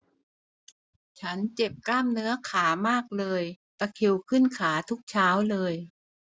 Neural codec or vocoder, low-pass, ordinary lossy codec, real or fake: none; none; none; real